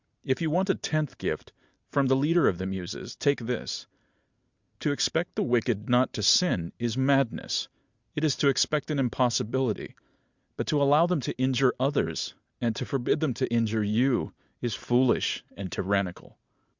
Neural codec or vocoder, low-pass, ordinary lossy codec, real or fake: none; 7.2 kHz; Opus, 64 kbps; real